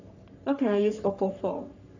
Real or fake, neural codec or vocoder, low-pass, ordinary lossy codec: fake; codec, 44.1 kHz, 3.4 kbps, Pupu-Codec; 7.2 kHz; none